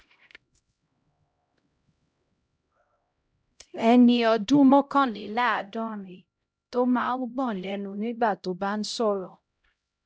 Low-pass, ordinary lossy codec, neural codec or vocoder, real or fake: none; none; codec, 16 kHz, 0.5 kbps, X-Codec, HuBERT features, trained on LibriSpeech; fake